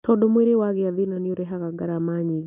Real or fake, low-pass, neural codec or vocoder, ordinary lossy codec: real; 3.6 kHz; none; none